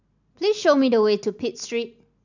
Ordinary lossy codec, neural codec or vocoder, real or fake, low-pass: none; none; real; 7.2 kHz